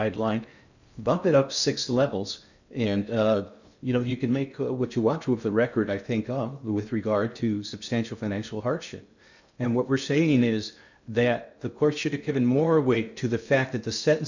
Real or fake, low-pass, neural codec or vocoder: fake; 7.2 kHz; codec, 16 kHz in and 24 kHz out, 0.6 kbps, FocalCodec, streaming, 2048 codes